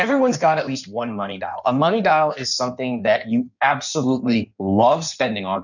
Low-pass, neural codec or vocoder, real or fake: 7.2 kHz; codec, 16 kHz in and 24 kHz out, 1.1 kbps, FireRedTTS-2 codec; fake